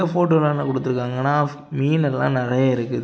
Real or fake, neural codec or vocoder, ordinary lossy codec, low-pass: real; none; none; none